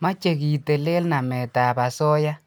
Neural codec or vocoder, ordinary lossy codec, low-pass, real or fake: none; none; none; real